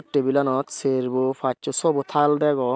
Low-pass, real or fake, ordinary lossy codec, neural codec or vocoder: none; real; none; none